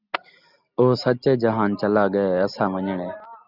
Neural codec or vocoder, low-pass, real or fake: none; 5.4 kHz; real